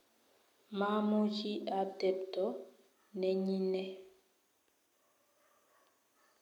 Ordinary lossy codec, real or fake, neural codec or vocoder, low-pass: none; real; none; 19.8 kHz